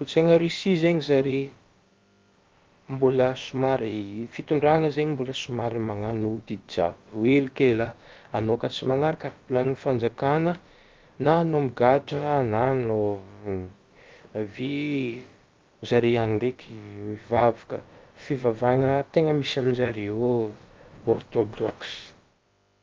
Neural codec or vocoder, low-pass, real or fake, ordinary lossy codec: codec, 16 kHz, about 1 kbps, DyCAST, with the encoder's durations; 7.2 kHz; fake; Opus, 24 kbps